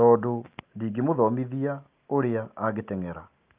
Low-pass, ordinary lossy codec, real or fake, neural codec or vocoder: 3.6 kHz; Opus, 32 kbps; real; none